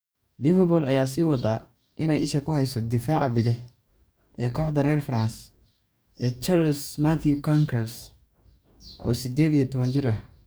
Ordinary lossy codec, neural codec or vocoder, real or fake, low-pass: none; codec, 44.1 kHz, 2.6 kbps, DAC; fake; none